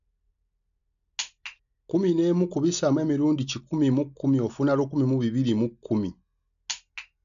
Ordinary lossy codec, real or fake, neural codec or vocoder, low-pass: none; real; none; 7.2 kHz